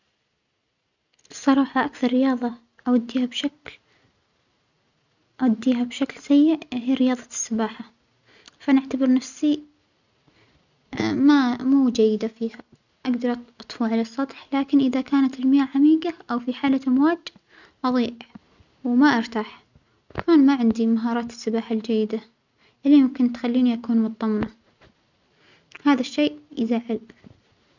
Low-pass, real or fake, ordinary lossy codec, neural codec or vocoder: 7.2 kHz; real; none; none